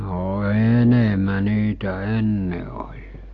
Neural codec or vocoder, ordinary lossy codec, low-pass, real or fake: none; AAC, 48 kbps; 7.2 kHz; real